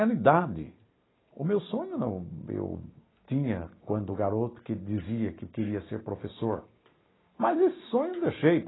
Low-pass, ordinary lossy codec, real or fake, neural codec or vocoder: 7.2 kHz; AAC, 16 kbps; real; none